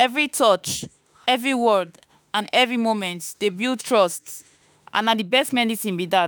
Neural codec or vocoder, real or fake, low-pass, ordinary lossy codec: autoencoder, 48 kHz, 32 numbers a frame, DAC-VAE, trained on Japanese speech; fake; none; none